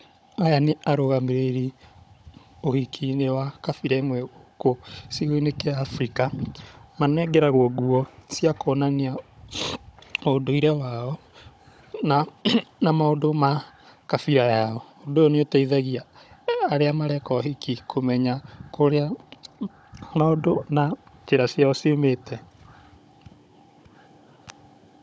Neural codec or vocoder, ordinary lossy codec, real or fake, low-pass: codec, 16 kHz, 16 kbps, FunCodec, trained on Chinese and English, 50 frames a second; none; fake; none